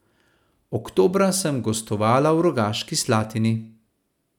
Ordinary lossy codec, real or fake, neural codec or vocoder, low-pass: none; real; none; 19.8 kHz